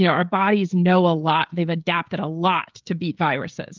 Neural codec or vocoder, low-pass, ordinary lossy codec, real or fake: codec, 16 kHz, 16 kbps, FreqCodec, smaller model; 7.2 kHz; Opus, 32 kbps; fake